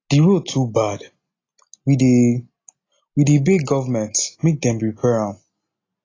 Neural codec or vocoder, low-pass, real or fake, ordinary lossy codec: none; 7.2 kHz; real; AAC, 32 kbps